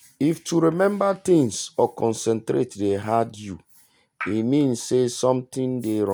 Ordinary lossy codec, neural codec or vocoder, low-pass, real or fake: none; none; none; real